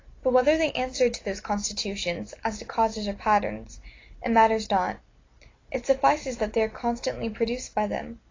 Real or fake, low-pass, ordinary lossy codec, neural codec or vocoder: real; 7.2 kHz; AAC, 32 kbps; none